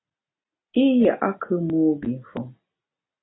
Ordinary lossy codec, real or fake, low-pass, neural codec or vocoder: AAC, 16 kbps; real; 7.2 kHz; none